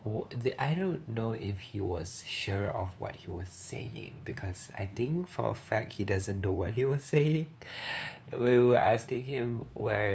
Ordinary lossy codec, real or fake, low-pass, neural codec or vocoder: none; fake; none; codec, 16 kHz, 2 kbps, FunCodec, trained on LibriTTS, 25 frames a second